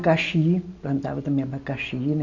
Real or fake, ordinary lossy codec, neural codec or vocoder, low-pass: real; none; none; 7.2 kHz